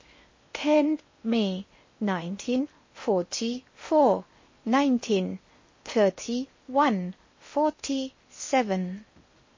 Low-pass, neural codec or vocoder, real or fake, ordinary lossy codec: 7.2 kHz; codec, 16 kHz in and 24 kHz out, 0.8 kbps, FocalCodec, streaming, 65536 codes; fake; MP3, 32 kbps